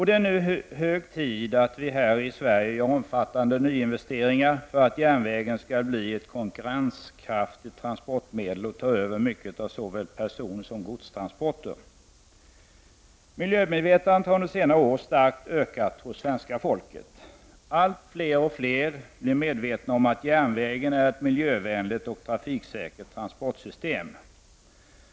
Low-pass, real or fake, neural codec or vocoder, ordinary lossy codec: none; real; none; none